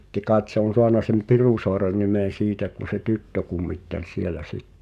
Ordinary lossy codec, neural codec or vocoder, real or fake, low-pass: none; codec, 44.1 kHz, 7.8 kbps, DAC; fake; 14.4 kHz